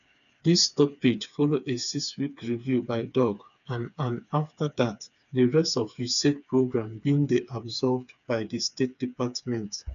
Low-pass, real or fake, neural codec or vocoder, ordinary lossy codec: 7.2 kHz; fake; codec, 16 kHz, 4 kbps, FreqCodec, smaller model; none